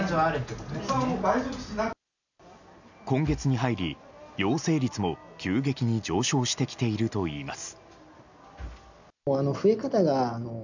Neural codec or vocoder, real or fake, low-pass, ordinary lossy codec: none; real; 7.2 kHz; none